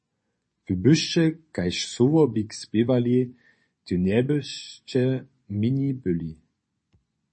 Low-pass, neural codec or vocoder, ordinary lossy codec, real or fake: 9.9 kHz; vocoder, 24 kHz, 100 mel bands, Vocos; MP3, 32 kbps; fake